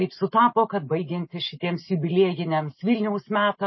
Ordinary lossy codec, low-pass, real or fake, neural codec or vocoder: MP3, 24 kbps; 7.2 kHz; real; none